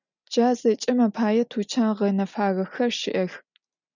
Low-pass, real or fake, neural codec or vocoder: 7.2 kHz; real; none